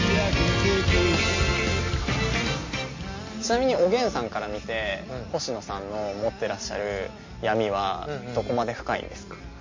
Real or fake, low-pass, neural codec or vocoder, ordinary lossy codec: real; 7.2 kHz; none; MP3, 32 kbps